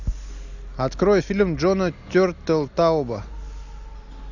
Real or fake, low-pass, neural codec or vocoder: real; 7.2 kHz; none